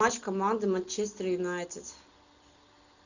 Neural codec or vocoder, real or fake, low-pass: none; real; 7.2 kHz